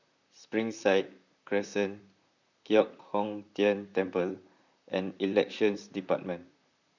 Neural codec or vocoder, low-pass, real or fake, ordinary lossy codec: vocoder, 44.1 kHz, 128 mel bands, Pupu-Vocoder; 7.2 kHz; fake; none